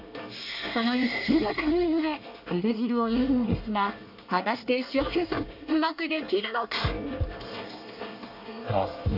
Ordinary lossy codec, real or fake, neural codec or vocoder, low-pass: AAC, 48 kbps; fake; codec, 24 kHz, 1 kbps, SNAC; 5.4 kHz